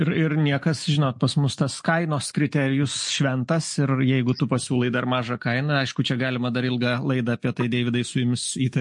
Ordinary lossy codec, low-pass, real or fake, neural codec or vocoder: MP3, 48 kbps; 10.8 kHz; real; none